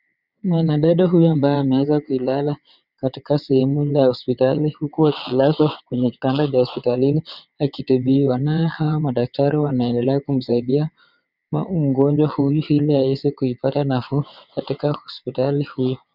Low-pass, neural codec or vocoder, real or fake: 5.4 kHz; vocoder, 22.05 kHz, 80 mel bands, WaveNeXt; fake